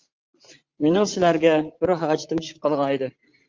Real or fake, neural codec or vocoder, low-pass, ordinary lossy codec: fake; vocoder, 44.1 kHz, 80 mel bands, Vocos; 7.2 kHz; Opus, 32 kbps